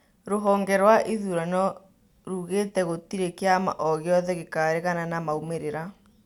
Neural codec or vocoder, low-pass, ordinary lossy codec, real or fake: none; 19.8 kHz; none; real